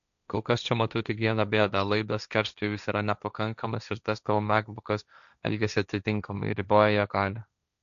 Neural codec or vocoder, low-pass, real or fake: codec, 16 kHz, 1.1 kbps, Voila-Tokenizer; 7.2 kHz; fake